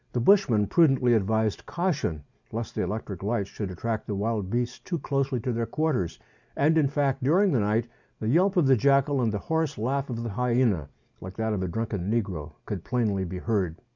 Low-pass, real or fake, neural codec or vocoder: 7.2 kHz; real; none